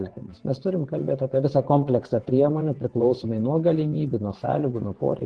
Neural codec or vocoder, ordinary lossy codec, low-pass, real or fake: vocoder, 22.05 kHz, 80 mel bands, WaveNeXt; Opus, 16 kbps; 9.9 kHz; fake